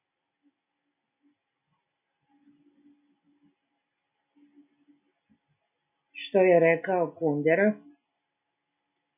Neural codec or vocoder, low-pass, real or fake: none; 3.6 kHz; real